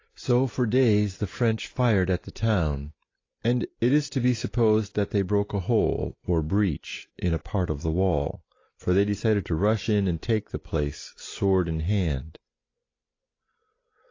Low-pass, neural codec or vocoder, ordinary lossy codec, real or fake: 7.2 kHz; none; AAC, 32 kbps; real